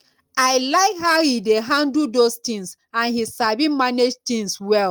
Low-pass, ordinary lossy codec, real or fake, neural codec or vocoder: 19.8 kHz; Opus, 32 kbps; real; none